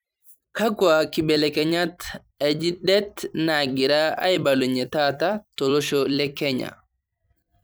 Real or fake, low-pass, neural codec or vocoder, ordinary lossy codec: fake; none; vocoder, 44.1 kHz, 128 mel bands every 256 samples, BigVGAN v2; none